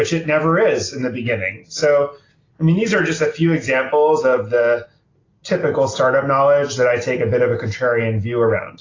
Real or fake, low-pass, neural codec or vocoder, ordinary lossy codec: real; 7.2 kHz; none; AAC, 32 kbps